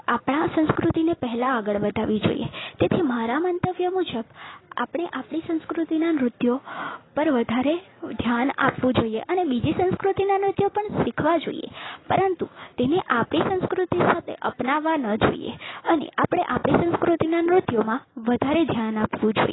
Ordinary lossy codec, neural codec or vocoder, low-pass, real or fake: AAC, 16 kbps; none; 7.2 kHz; real